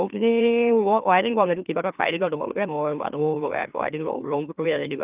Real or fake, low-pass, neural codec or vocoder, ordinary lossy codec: fake; 3.6 kHz; autoencoder, 44.1 kHz, a latent of 192 numbers a frame, MeloTTS; Opus, 32 kbps